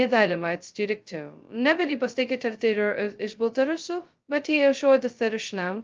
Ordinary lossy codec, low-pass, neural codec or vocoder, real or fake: Opus, 24 kbps; 7.2 kHz; codec, 16 kHz, 0.2 kbps, FocalCodec; fake